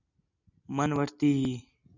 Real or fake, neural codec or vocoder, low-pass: real; none; 7.2 kHz